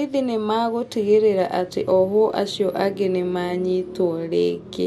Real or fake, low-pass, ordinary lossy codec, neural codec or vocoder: real; 19.8 kHz; MP3, 64 kbps; none